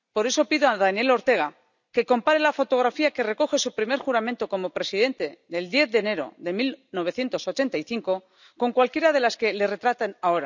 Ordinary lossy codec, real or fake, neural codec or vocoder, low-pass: none; real; none; 7.2 kHz